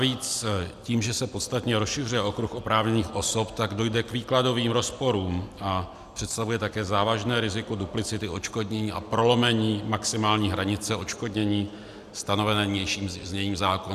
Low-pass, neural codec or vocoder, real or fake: 14.4 kHz; none; real